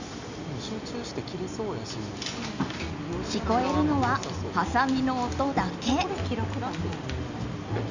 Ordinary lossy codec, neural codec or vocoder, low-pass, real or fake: Opus, 64 kbps; none; 7.2 kHz; real